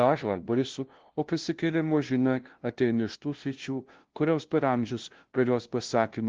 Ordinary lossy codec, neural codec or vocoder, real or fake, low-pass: Opus, 16 kbps; codec, 16 kHz, 0.5 kbps, FunCodec, trained on LibriTTS, 25 frames a second; fake; 7.2 kHz